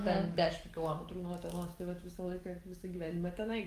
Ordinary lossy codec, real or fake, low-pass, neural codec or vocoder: Opus, 32 kbps; fake; 14.4 kHz; codec, 44.1 kHz, 7.8 kbps, DAC